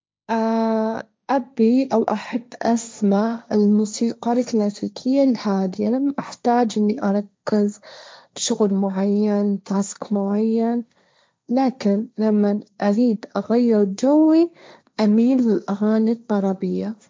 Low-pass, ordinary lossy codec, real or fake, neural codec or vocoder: none; none; fake; codec, 16 kHz, 1.1 kbps, Voila-Tokenizer